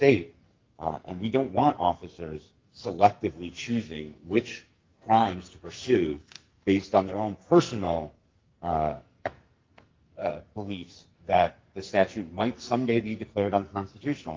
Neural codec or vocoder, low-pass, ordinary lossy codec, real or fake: codec, 44.1 kHz, 2.6 kbps, SNAC; 7.2 kHz; Opus, 32 kbps; fake